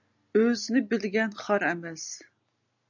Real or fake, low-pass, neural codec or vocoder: real; 7.2 kHz; none